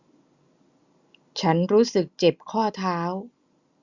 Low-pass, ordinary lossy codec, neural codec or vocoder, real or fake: 7.2 kHz; Opus, 64 kbps; none; real